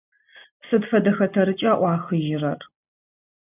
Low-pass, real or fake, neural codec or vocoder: 3.6 kHz; real; none